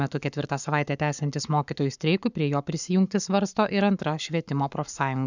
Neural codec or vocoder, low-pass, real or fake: codec, 44.1 kHz, 7.8 kbps, Pupu-Codec; 7.2 kHz; fake